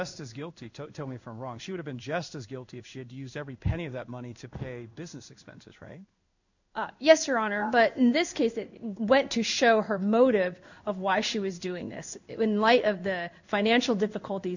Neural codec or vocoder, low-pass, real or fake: codec, 16 kHz in and 24 kHz out, 1 kbps, XY-Tokenizer; 7.2 kHz; fake